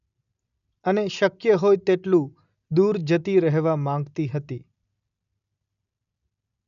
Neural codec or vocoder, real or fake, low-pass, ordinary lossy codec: none; real; 7.2 kHz; MP3, 96 kbps